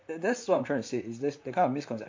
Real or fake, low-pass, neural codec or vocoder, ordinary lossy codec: fake; 7.2 kHz; vocoder, 44.1 kHz, 128 mel bands, Pupu-Vocoder; MP3, 48 kbps